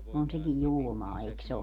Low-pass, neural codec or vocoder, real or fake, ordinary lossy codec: 19.8 kHz; none; real; none